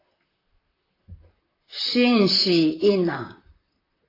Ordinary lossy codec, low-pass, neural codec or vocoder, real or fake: AAC, 24 kbps; 5.4 kHz; vocoder, 44.1 kHz, 128 mel bands, Pupu-Vocoder; fake